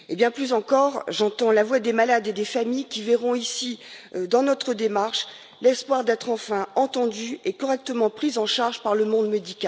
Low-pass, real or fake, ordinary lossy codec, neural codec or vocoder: none; real; none; none